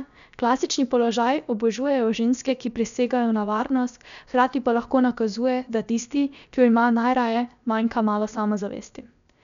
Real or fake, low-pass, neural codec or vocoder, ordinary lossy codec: fake; 7.2 kHz; codec, 16 kHz, about 1 kbps, DyCAST, with the encoder's durations; MP3, 96 kbps